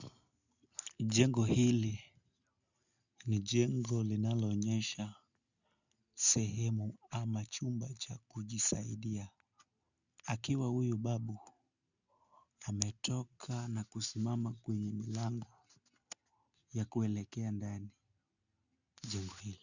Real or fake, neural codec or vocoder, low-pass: real; none; 7.2 kHz